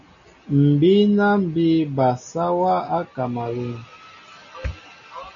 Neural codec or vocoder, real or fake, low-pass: none; real; 7.2 kHz